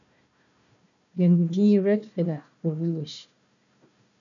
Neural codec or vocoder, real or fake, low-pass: codec, 16 kHz, 1 kbps, FunCodec, trained on Chinese and English, 50 frames a second; fake; 7.2 kHz